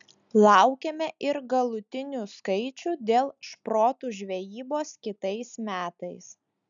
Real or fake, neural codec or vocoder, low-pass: real; none; 7.2 kHz